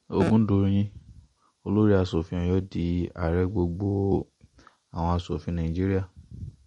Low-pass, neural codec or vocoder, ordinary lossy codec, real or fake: 19.8 kHz; none; MP3, 48 kbps; real